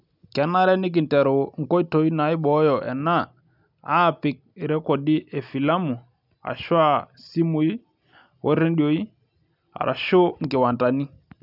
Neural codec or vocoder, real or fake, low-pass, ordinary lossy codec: none; real; 5.4 kHz; none